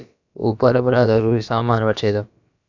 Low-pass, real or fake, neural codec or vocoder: 7.2 kHz; fake; codec, 16 kHz, about 1 kbps, DyCAST, with the encoder's durations